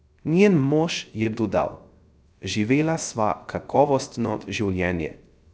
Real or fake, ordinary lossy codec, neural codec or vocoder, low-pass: fake; none; codec, 16 kHz, 0.3 kbps, FocalCodec; none